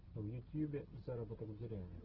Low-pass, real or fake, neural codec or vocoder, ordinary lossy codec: 5.4 kHz; real; none; Opus, 16 kbps